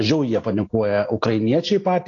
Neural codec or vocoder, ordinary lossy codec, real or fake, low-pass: none; AAC, 32 kbps; real; 7.2 kHz